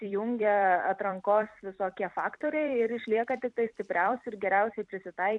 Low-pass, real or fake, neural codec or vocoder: 10.8 kHz; fake; vocoder, 44.1 kHz, 128 mel bands every 256 samples, BigVGAN v2